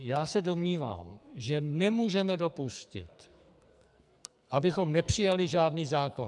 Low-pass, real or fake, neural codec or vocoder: 10.8 kHz; fake; codec, 44.1 kHz, 2.6 kbps, SNAC